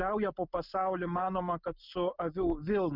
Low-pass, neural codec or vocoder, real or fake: 5.4 kHz; none; real